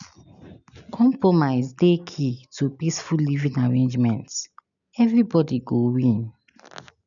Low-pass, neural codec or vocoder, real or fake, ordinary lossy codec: 7.2 kHz; none; real; none